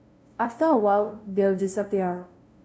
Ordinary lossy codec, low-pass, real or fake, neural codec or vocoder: none; none; fake; codec, 16 kHz, 0.5 kbps, FunCodec, trained on LibriTTS, 25 frames a second